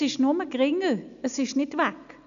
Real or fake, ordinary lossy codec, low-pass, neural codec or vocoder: real; none; 7.2 kHz; none